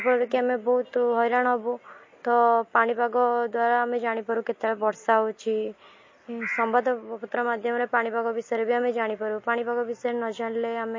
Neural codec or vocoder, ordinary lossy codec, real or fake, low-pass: none; MP3, 32 kbps; real; 7.2 kHz